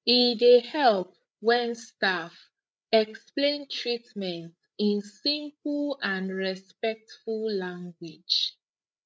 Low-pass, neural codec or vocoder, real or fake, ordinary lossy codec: none; codec, 16 kHz, 8 kbps, FreqCodec, larger model; fake; none